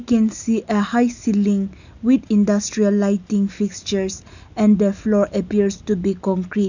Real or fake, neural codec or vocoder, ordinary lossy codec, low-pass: real; none; none; 7.2 kHz